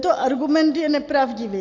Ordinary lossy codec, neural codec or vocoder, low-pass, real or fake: AAC, 48 kbps; none; 7.2 kHz; real